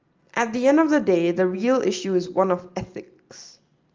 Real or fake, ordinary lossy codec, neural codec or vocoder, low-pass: real; Opus, 24 kbps; none; 7.2 kHz